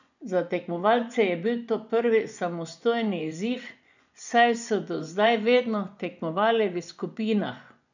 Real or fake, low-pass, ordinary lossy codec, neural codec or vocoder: fake; 7.2 kHz; none; vocoder, 24 kHz, 100 mel bands, Vocos